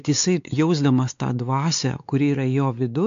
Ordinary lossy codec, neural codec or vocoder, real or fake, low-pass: AAC, 64 kbps; codec, 16 kHz, 4 kbps, X-Codec, WavLM features, trained on Multilingual LibriSpeech; fake; 7.2 kHz